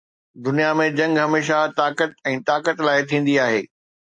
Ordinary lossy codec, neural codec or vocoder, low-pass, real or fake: MP3, 48 kbps; none; 9.9 kHz; real